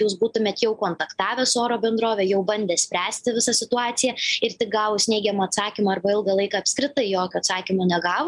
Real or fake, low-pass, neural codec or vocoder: real; 10.8 kHz; none